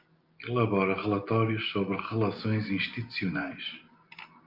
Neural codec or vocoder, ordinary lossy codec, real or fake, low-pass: none; Opus, 24 kbps; real; 5.4 kHz